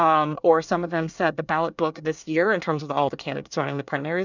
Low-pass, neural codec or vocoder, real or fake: 7.2 kHz; codec, 24 kHz, 1 kbps, SNAC; fake